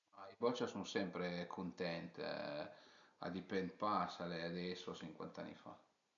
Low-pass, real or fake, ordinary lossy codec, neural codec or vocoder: 7.2 kHz; real; none; none